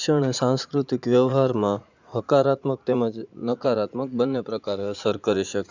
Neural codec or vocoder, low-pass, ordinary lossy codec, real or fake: vocoder, 44.1 kHz, 80 mel bands, Vocos; 7.2 kHz; Opus, 64 kbps; fake